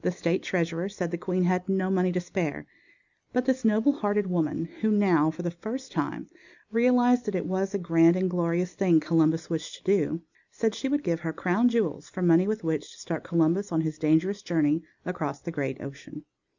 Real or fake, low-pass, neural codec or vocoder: real; 7.2 kHz; none